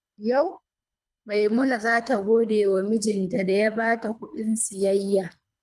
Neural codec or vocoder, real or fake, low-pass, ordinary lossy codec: codec, 24 kHz, 3 kbps, HILCodec; fake; none; none